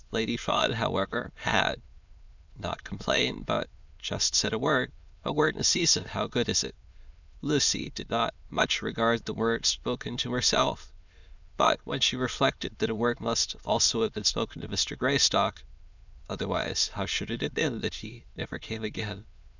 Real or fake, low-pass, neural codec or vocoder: fake; 7.2 kHz; autoencoder, 22.05 kHz, a latent of 192 numbers a frame, VITS, trained on many speakers